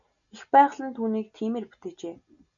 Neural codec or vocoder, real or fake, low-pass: none; real; 7.2 kHz